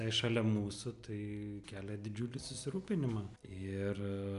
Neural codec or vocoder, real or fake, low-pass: none; real; 10.8 kHz